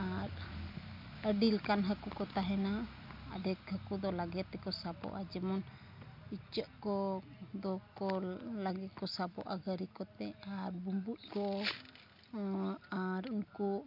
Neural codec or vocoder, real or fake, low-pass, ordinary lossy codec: none; real; 5.4 kHz; MP3, 48 kbps